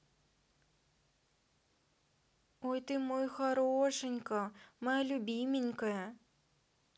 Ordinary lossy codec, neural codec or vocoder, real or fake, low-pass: none; none; real; none